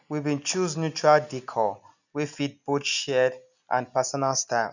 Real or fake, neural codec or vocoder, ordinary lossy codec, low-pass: real; none; none; 7.2 kHz